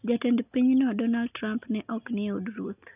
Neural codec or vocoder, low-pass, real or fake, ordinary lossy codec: none; 3.6 kHz; real; none